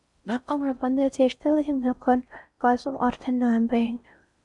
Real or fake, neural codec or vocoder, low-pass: fake; codec, 16 kHz in and 24 kHz out, 0.6 kbps, FocalCodec, streaming, 4096 codes; 10.8 kHz